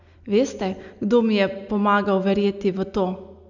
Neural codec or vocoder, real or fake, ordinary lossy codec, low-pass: none; real; none; 7.2 kHz